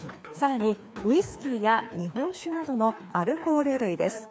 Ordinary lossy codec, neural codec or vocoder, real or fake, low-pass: none; codec, 16 kHz, 2 kbps, FreqCodec, larger model; fake; none